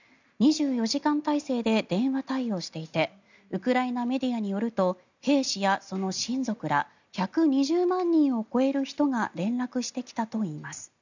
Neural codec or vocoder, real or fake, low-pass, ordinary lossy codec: none; real; 7.2 kHz; none